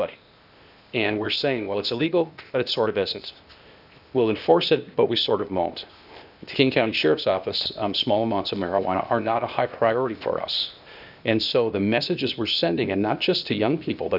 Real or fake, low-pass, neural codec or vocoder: fake; 5.4 kHz; codec, 16 kHz, 0.8 kbps, ZipCodec